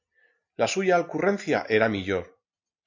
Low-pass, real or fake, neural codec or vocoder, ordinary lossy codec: 7.2 kHz; real; none; AAC, 48 kbps